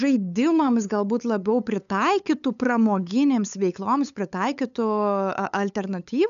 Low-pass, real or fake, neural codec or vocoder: 7.2 kHz; fake; codec, 16 kHz, 8 kbps, FunCodec, trained on LibriTTS, 25 frames a second